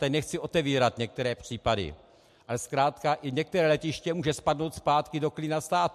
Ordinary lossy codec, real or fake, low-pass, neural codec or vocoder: MP3, 64 kbps; real; 14.4 kHz; none